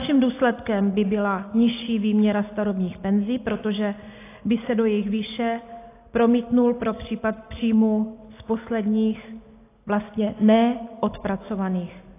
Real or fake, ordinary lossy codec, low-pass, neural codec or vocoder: real; AAC, 24 kbps; 3.6 kHz; none